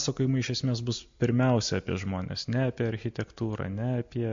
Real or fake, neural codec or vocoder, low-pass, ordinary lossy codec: real; none; 7.2 kHz; MP3, 48 kbps